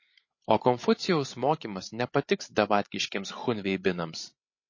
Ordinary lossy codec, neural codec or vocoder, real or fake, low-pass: MP3, 32 kbps; codec, 16 kHz, 16 kbps, FreqCodec, larger model; fake; 7.2 kHz